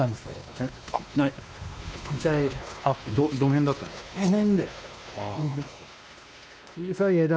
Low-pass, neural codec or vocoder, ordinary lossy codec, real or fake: none; codec, 16 kHz, 2 kbps, X-Codec, WavLM features, trained on Multilingual LibriSpeech; none; fake